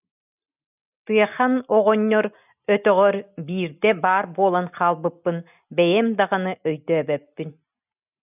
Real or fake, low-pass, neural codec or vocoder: real; 3.6 kHz; none